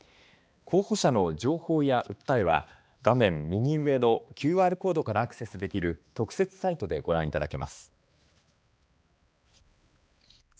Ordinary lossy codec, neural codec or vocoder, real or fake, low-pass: none; codec, 16 kHz, 2 kbps, X-Codec, HuBERT features, trained on balanced general audio; fake; none